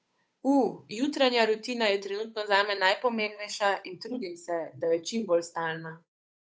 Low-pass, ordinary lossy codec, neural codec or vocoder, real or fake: none; none; codec, 16 kHz, 8 kbps, FunCodec, trained on Chinese and English, 25 frames a second; fake